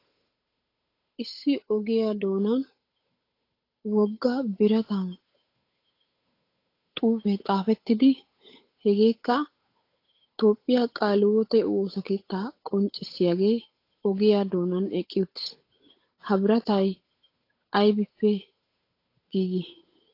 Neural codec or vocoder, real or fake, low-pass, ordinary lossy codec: codec, 16 kHz, 8 kbps, FunCodec, trained on Chinese and English, 25 frames a second; fake; 5.4 kHz; AAC, 32 kbps